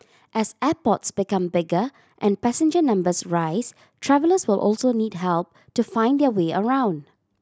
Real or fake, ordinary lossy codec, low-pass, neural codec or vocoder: real; none; none; none